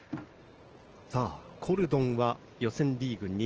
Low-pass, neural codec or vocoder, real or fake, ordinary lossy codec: 7.2 kHz; none; real; Opus, 16 kbps